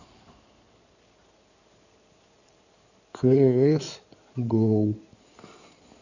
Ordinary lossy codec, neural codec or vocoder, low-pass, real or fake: none; codec, 16 kHz in and 24 kHz out, 2.2 kbps, FireRedTTS-2 codec; 7.2 kHz; fake